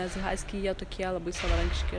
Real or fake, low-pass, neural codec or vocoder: real; 9.9 kHz; none